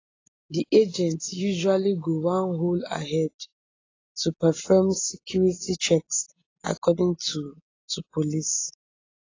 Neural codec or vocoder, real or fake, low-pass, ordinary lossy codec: none; real; 7.2 kHz; AAC, 32 kbps